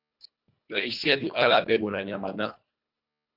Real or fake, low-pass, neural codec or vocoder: fake; 5.4 kHz; codec, 24 kHz, 1.5 kbps, HILCodec